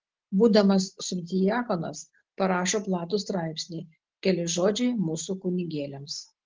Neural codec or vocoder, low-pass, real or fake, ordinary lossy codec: none; 7.2 kHz; real; Opus, 16 kbps